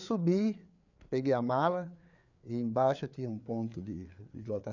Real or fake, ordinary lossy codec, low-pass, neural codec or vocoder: fake; none; 7.2 kHz; codec, 16 kHz, 4 kbps, FreqCodec, larger model